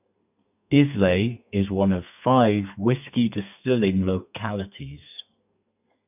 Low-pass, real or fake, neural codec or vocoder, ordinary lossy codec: 3.6 kHz; fake; codec, 32 kHz, 1.9 kbps, SNAC; none